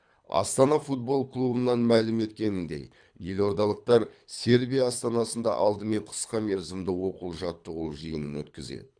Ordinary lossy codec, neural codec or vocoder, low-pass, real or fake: none; codec, 24 kHz, 3 kbps, HILCodec; 9.9 kHz; fake